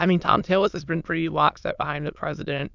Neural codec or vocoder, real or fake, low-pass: autoencoder, 22.05 kHz, a latent of 192 numbers a frame, VITS, trained on many speakers; fake; 7.2 kHz